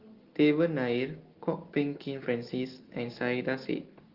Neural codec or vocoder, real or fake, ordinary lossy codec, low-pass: none; real; Opus, 16 kbps; 5.4 kHz